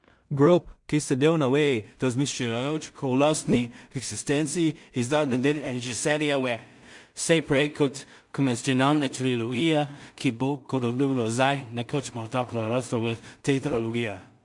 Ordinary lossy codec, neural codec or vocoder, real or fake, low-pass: MP3, 64 kbps; codec, 16 kHz in and 24 kHz out, 0.4 kbps, LongCat-Audio-Codec, two codebook decoder; fake; 10.8 kHz